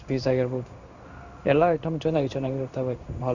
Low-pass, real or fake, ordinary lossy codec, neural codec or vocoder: 7.2 kHz; fake; none; codec, 16 kHz in and 24 kHz out, 1 kbps, XY-Tokenizer